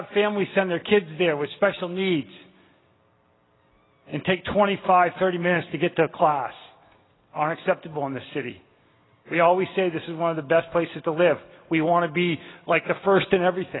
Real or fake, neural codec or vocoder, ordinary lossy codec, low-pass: real; none; AAC, 16 kbps; 7.2 kHz